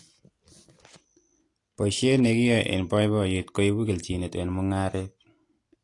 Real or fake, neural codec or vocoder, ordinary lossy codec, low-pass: real; none; AAC, 48 kbps; 10.8 kHz